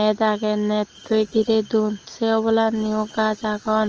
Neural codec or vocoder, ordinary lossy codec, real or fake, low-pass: none; Opus, 16 kbps; real; 7.2 kHz